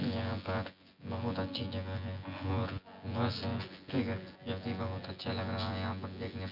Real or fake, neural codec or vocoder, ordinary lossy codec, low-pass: fake; vocoder, 24 kHz, 100 mel bands, Vocos; none; 5.4 kHz